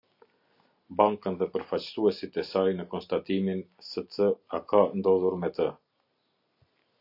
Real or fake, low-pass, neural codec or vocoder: real; 5.4 kHz; none